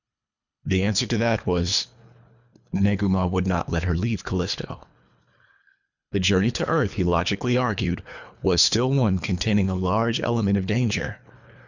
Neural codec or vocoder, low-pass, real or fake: codec, 24 kHz, 3 kbps, HILCodec; 7.2 kHz; fake